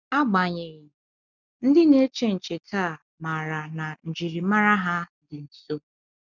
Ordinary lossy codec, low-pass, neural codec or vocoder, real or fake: none; 7.2 kHz; none; real